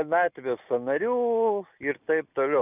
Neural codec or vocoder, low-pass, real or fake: none; 3.6 kHz; real